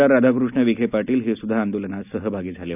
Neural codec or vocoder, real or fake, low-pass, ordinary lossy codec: none; real; 3.6 kHz; none